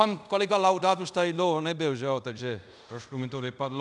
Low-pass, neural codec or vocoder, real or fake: 10.8 kHz; codec, 24 kHz, 0.5 kbps, DualCodec; fake